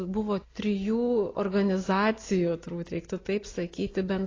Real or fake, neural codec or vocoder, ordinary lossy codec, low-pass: real; none; AAC, 32 kbps; 7.2 kHz